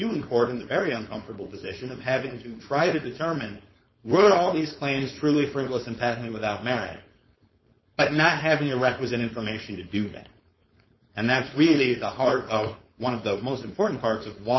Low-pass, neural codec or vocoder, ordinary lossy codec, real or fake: 7.2 kHz; codec, 16 kHz, 4.8 kbps, FACodec; MP3, 24 kbps; fake